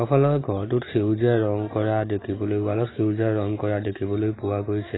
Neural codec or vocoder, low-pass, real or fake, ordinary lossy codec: none; 7.2 kHz; real; AAC, 16 kbps